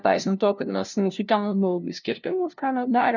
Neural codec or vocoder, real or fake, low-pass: codec, 16 kHz, 0.5 kbps, FunCodec, trained on LibriTTS, 25 frames a second; fake; 7.2 kHz